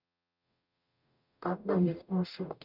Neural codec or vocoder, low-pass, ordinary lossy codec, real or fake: codec, 44.1 kHz, 0.9 kbps, DAC; 5.4 kHz; none; fake